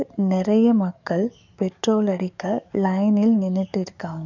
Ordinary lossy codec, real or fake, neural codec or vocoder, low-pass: none; fake; codec, 44.1 kHz, 7.8 kbps, DAC; 7.2 kHz